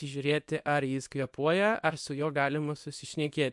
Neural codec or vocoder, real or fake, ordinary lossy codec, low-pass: codec, 24 kHz, 0.9 kbps, WavTokenizer, small release; fake; MP3, 64 kbps; 10.8 kHz